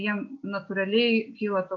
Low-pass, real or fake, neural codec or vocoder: 7.2 kHz; real; none